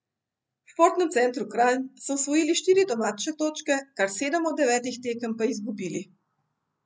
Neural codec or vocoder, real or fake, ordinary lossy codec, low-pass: none; real; none; none